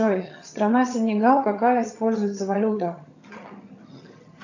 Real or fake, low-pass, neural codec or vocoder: fake; 7.2 kHz; vocoder, 22.05 kHz, 80 mel bands, HiFi-GAN